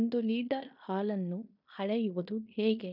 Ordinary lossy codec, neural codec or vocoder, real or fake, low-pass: none; codec, 16 kHz in and 24 kHz out, 0.9 kbps, LongCat-Audio-Codec, fine tuned four codebook decoder; fake; 5.4 kHz